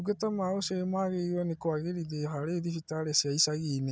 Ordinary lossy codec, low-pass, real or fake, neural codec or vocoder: none; none; real; none